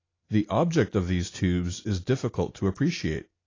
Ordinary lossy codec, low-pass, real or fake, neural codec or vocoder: AAC, 32 kbps; 7.2 kHz; real; none